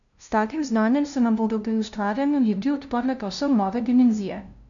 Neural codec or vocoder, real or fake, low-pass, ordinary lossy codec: codec, 16 kHz, 0.5 kbps, FunCodec, trained on LibriTTS, 25 frames a second; fake; 7.2 kHz; none